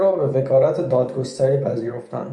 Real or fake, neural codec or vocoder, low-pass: fake; vocoder, 24 kHz, 100 mel bands, Vocos; 10.8 kHz